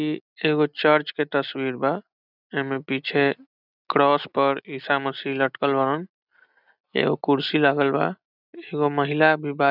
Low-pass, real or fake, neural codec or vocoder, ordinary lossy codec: 5.4 kHz; real; none; none